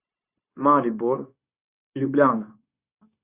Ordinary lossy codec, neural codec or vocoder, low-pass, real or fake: Opus, 64 kbps; codec, 16 kHz, 0.9 kbps, LongCat-Audio-Codec; 3.6 kHz; fake